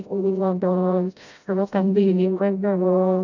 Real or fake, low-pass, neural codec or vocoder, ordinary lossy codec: fake; 7.2 kHz; codec, 16 kHz, 0.5 kbps, FreqCodec, smaller model; none